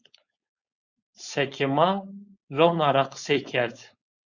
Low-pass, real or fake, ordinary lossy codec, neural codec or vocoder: 7.2 kHz; fake; Opus, 64 kbps; codec, 16 kHz, 4.8 kbps, FACodec